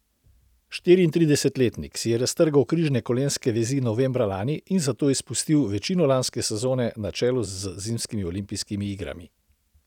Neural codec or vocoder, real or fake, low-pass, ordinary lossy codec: none; real; 19.8 kHz; none